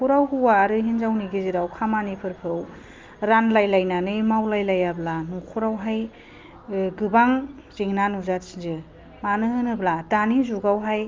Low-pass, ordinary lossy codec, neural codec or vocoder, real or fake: 7.2 kHz; Opus, 24 kbps; none; real